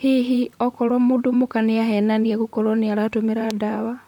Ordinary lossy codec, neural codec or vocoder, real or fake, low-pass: MP3, 96 kbps; vocoder, 44.1 kHz, 128 mel bands, Pupu-Vocoder; fake; 19.8 kHz